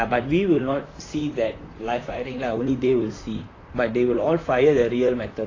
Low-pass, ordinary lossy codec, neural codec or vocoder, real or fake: 7.2 kHz; AAC, 32 kbps; vocoder, 44.1 kHz, 128 mel bands, Pupu-Vocoder; fake